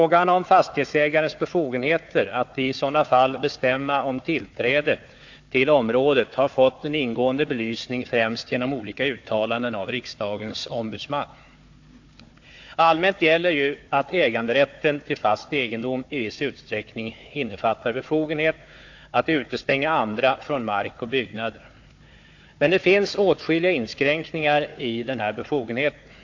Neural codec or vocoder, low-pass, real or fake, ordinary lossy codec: codec, 16 kHz, 4 kbps, FunCodec, trained on Chinese and English, 50 frames a second; 7.2 kHz; fake; AAC, 48 kbps